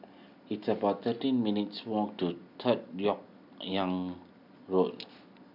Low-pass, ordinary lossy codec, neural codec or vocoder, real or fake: 5.4 kHz; none; none; real